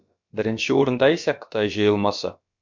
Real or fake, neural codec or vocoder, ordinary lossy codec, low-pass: fake; codec, 16 kHz, about 1 kbps, DyCAST, with the encoder's durations; MP3, 48 kbps; 7.2 kHz